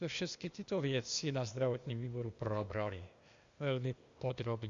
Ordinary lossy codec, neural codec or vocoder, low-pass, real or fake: Opus, 64 kbps; codec, 16 kHz, 0.8 kbps, ZipCodec; 7.2 kHz; fake